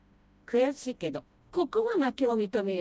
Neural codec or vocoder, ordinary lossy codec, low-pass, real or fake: codec, 16 kHz, 1 kbps, FreqCodec, smaller model; none; none; fake